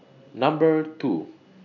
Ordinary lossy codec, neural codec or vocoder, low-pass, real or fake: none; none; 7.2 kHz; real